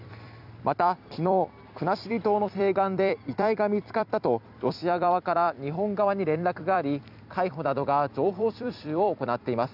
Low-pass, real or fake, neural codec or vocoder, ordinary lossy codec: 5.4 kHz; fake; vocoder, 44.1 kHz, 128 mel bands every 256 samples, BigVGAN v2; none